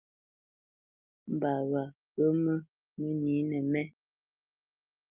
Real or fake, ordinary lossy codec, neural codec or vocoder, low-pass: real; Opus, 24 kbps; none; 3.6 kHz